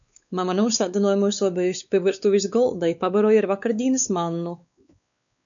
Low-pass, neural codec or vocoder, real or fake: 7.2 kHz; codec, 16 kHz, 4 kbps, X-Codec, WavLM features, trained on Multilingual LibriSpeech; fake